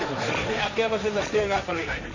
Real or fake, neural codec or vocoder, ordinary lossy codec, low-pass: fake; codec, 16 kHz, 1.1 kbps, Voila-Tokenizer; none; 7.2 kHz